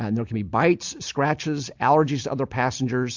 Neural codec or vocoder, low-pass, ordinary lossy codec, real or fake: none; 7.2 kHz; MP3, 64 kbps; real